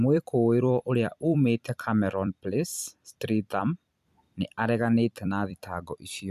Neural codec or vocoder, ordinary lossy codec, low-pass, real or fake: vocoder, 44.1 kHz, 128 mel bands every 256 samples, BigVGAN v2; none; 14.4 kHz; fake